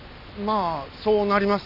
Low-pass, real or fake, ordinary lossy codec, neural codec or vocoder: 5.4 kHz; real; none; none